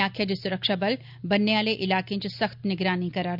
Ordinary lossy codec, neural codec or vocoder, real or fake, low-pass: none; none; real; 5.4 kHz